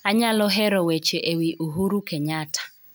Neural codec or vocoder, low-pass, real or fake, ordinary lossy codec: none; none; real; none